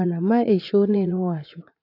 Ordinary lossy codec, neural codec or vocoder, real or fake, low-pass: AAC, 32 kbps; vocoder, 44.1 kHz, 80 mel bands, Vocos; fake; 5.4 kHz